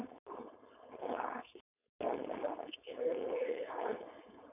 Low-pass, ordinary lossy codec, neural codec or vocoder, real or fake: 3.6 kHz; none; codec, 16 kHz, 4.8 kbps, FACodec; fake